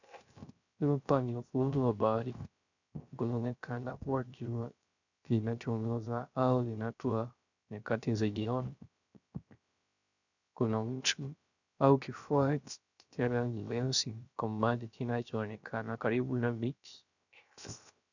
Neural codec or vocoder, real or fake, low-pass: codec, 16 kHz, 0.3 kbps, FocalCodec; fake; 7.2 kHz